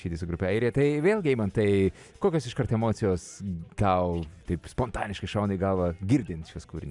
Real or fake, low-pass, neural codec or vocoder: real; 10.8 kHz; none